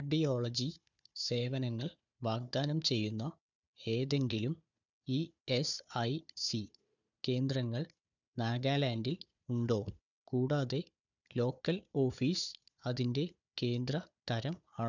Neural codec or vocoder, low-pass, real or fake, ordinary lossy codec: codec, 16 kHz, 4 kbps, FunCodec, trained on Chinese and English, 50 frames a second; 7.2 kHz; fake; none